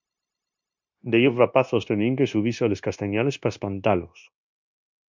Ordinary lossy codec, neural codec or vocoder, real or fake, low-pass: MP3, 64 kbps; codec, 16 kHz, 0.9 kbps, LongCat-Audio-Codec; fake; 7.2 kHz